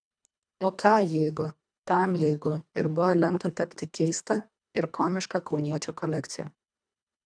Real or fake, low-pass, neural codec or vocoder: fake; 9.9 kHz; codec, 24 kHz, 1.5 kbps, HILCodec